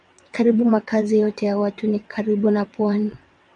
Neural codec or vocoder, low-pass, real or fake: vocoder, 22.05 kHz, 80 mel bands, WaveNeXt; 9.9 kHz; fake